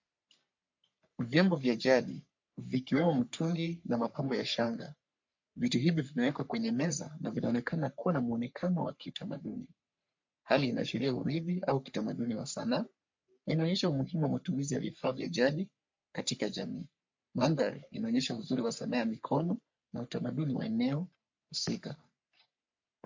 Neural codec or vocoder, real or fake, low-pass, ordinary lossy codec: codec, 44.1 kHz, 3.4 kbps, Pupu-Codec; fake; 7.2 kHz; MP3, 48 kbps